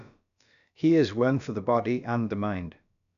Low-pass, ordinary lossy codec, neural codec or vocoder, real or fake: 7.2 kHz; none; codec, 16 kHz, about 1 kbps, DyCAST, with the encoder's durations; fake